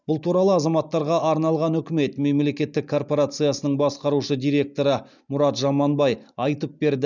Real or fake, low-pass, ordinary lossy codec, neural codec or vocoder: real; 7.2 kHz; none; none